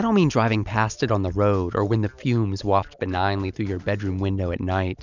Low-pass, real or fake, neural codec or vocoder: 7.2 kHz; real; none